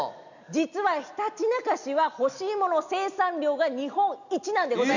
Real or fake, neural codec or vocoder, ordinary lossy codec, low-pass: real; none; none; 7.2 kHz